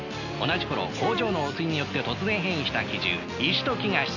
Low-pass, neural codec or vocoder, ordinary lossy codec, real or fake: 7.2 kHz; none; none; real